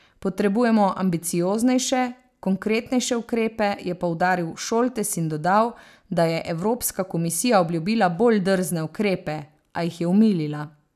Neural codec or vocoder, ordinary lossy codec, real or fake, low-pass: none; none; real; 14.4 kHz